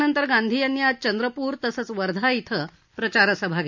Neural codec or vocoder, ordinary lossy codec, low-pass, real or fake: none; MP3, 64 kbps; 7.2 kHz; real